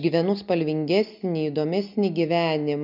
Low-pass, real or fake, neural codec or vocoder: 5.4 kHz; real; none